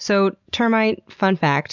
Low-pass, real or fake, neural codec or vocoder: 7.2 kHz; real; none